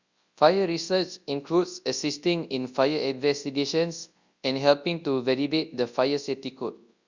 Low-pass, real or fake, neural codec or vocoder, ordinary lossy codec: 7.2 kHz; fake; codec, 24 kHz, 0.9 kbps, WavTokenizer, large speech release; Opus, 64 kbps